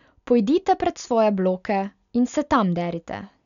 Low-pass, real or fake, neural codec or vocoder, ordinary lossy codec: 7.2 kHz; real; none; none